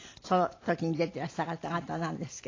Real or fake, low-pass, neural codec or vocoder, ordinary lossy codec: real; 7.2 kHz; none; AAC, 32 kbps